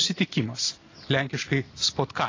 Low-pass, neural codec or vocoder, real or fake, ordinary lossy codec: 7.2 kHz; none; real; AAC, 32 kbps